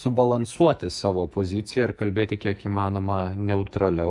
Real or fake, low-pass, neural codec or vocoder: fake; 10.8 kHz; codec, 44.1 kHz, 2.6 kbps, SNAC